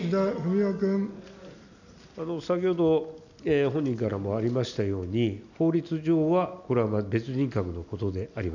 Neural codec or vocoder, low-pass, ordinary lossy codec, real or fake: none; 7.2 kHz; none; real